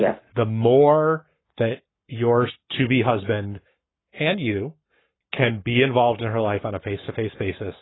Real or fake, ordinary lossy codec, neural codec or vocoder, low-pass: fake; AAC, 16 kbps; codec, 44.1 kHz, 7.8 kbps, Pupu-Codec; 7.2 kHz